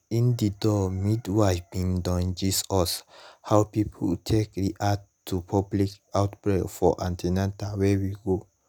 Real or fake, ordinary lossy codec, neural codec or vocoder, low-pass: real; none; none; none